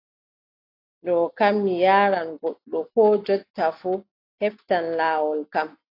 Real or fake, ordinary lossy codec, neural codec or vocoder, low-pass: real; AAC, 32 kbps; none; 5.4 kHz